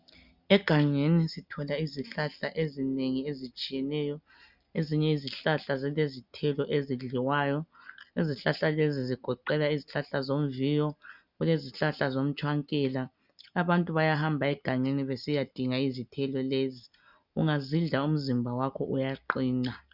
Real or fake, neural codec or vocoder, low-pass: real; none; 5.4 kHz